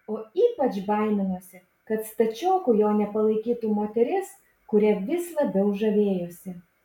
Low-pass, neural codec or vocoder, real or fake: 19.8 kHz; none; real